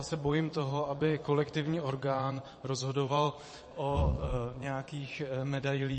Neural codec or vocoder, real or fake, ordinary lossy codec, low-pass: vocoder, 22.05 kHz, 80 mel bands, Vocos; fake; MP3, 32 kbps; 9.9 kHz